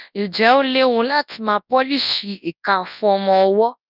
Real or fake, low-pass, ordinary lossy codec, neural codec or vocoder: fake; 5.4 kHz; none; codec, 24 kHz, 0.9 kbps, WavTokenizer, large speech release